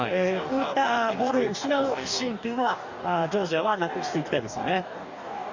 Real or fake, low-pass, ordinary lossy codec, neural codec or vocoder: fake; 7.2 kHz; none; codec, 44.1 kHz, 2.6 kbps, DAC